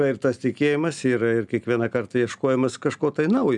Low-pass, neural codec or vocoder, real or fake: 10.8 kHz; none; real